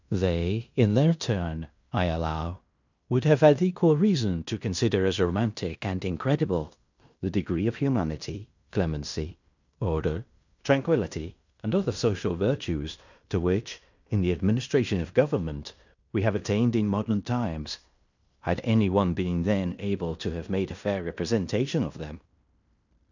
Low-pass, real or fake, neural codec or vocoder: 7.2 kHz; fake; codec, 16 kHz in and 24 kHz out, 0.9 kbps, LongCat-Audio-Codec, fine tuned four codebook decoder